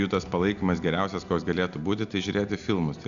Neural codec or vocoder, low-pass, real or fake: none; 7.2 kHz; real